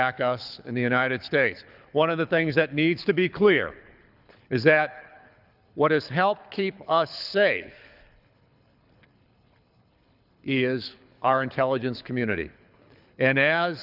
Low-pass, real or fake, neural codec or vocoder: 5.4 kHz; fake; codec, 24 kHz, 6 kbps, HILCodec